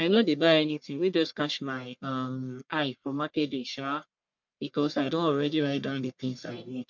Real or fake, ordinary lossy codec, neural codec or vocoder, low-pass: fake; MP3, 64 kbps; codec, 44.1 kHz, 1.7 kbps, Pupu-Codec; 7.2 kHz